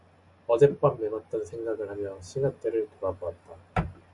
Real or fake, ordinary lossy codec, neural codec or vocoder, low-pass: real; MP3, 96 kbps; none; 10.8 kHz